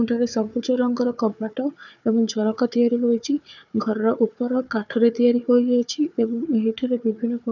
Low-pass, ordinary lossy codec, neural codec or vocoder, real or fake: 7.2 kHz; none; codec, 16 kHz, 4 kbps, FunCodec, trained on Chinese and English, 50 frames a second; fake